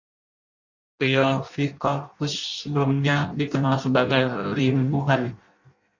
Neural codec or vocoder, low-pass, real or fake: codec, 16 kHz in and 24 kHz out, 0.6 kbps, FireRedTTS-2 codec; 7.2 kHz; fake